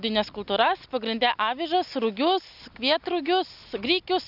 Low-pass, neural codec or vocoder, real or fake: 5.4 kHz; none; real